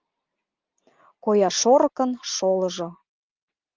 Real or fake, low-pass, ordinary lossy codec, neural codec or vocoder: real; 7.2 kHz; Opus, 24 kbps; none